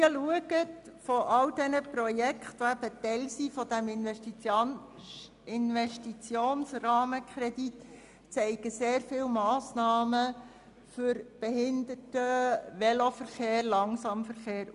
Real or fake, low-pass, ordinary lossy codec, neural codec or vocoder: real; 10.8 kHz; MP3, 96 kbps; none